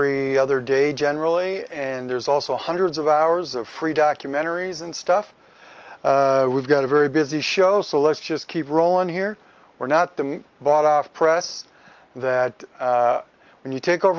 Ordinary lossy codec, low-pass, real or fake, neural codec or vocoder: Opus, 24 kbps; 7.2 kHz; real; none